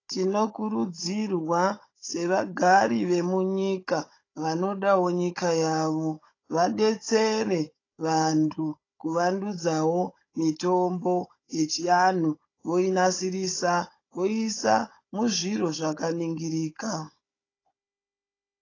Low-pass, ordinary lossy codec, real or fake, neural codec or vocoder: 7.2 kHz; AAC, 32 kbps; fake; codec, 16 kHz, 16 kbps, FunCodec, trained on Chinese and English, 50 frames a second